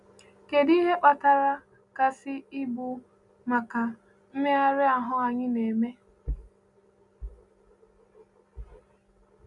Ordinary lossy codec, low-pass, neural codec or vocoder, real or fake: none; 10.8 kHz; none; real